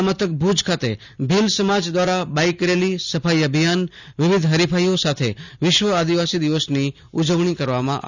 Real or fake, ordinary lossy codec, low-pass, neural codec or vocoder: real; none; 7.2 kHz; none